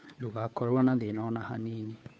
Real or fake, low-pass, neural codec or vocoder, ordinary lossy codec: fake; none; codec, 16 kHz, 2 kbps, FunCodec, trained on Chinese and English, 25 frames a second; none